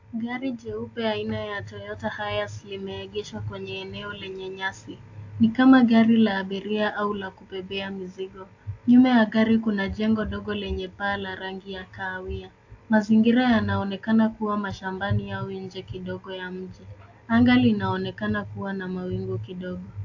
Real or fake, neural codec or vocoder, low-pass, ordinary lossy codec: real; none; 7.2 kHz; AAC, 48 kbps